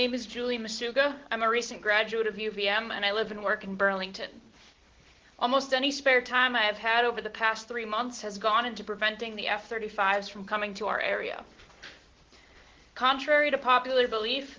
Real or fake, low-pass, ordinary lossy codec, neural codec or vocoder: real; 7.2 kHz; Opus, 16 kbps; none